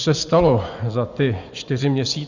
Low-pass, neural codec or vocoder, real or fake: 7.2 kHz; vocoder, 24 kHz, 100 mel bands, Vocos; fake